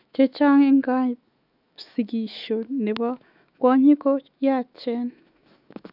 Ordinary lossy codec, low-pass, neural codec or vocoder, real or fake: none; 5.4 kHz; autoencoder, 48 kHz, 128 numbers a frame, DAC-VAE, trained on Japanese speech; fake